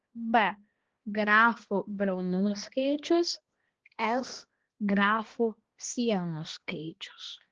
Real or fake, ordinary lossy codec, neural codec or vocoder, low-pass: fake; Opus, 16 kbps; codec, 16 kHz, 1 kbps, X-Codec, HuBERT features, trained on balanced general audio; 7.2 kHz